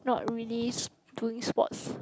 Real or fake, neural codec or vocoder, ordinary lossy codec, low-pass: real; none; none; none